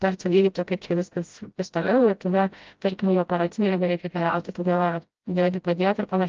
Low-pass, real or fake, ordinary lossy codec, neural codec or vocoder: 7.2 kHz; fake; Opus, 24 kbps; codec, 16 kHz, 0.5 kbps, FreqCodec, smaller model